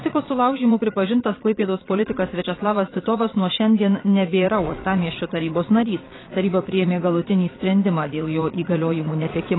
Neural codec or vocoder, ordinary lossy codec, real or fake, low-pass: vocoder, 22.05 kHz, 80 mel bands, Vocos; AAC, 16 kbps; fake; 7.2 kHz